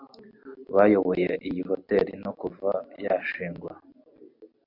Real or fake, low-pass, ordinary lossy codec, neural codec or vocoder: real; 5.4 kHz; MP3, 48 kbps; none